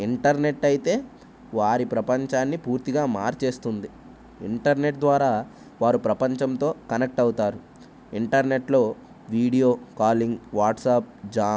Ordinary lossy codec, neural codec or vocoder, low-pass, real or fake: none; none; none; real